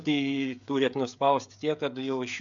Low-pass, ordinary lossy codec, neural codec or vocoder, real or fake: 7.2 kHz; MP3, 64 kbps; codec, 16 kHz, 16 kbps, FreqCodec, smaller model; fake